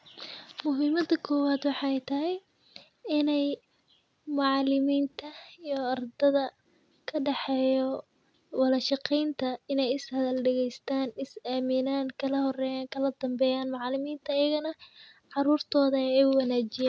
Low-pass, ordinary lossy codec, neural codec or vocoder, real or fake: none; none; none; real